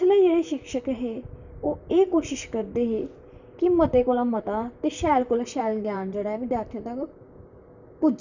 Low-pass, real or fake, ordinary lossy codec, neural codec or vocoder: 7.2 kHz; fake; none; vocoder, 44.1 kHz, 128 mel bands, Pupu-Vocoder